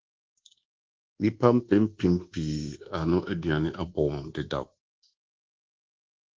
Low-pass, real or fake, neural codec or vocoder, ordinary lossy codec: 7.2 kHz; fake; codec, 24 kHz, 1.2 kbps, DualCodec; Opus, 16 kbps